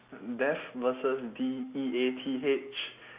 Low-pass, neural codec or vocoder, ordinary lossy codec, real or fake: 3.6 kHz; none; Opus, 64 kbps; real